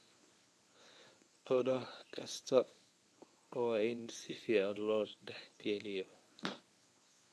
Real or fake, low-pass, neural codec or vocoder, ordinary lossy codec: fake; none; codec, 24 kHz, 0.9 kbps, WavTokenizer, medium speech release version 1; none